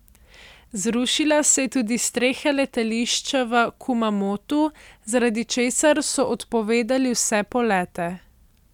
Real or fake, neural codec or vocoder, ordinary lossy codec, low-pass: real; none; none; 19.8 kHz